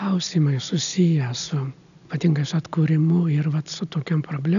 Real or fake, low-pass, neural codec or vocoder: real; 7.2 kHz; none